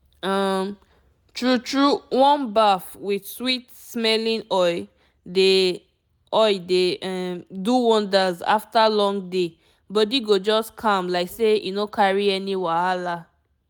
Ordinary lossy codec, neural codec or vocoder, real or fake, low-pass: none; none; real; none